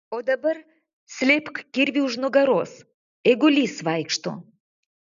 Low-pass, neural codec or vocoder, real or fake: 7.2 kHz; none; real